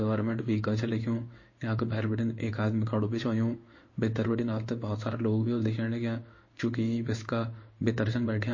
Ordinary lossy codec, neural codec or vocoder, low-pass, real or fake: MP3, 32 kbps; codec, 16 kHz in and 24 kHz out, 1 kbps, XY-Tokenizer; 7.2 kHz; fake